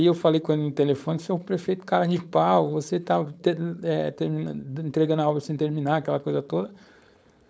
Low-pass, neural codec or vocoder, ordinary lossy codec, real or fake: none; codec, 16 kHz, 4.8 kbps, FACodec; none; fake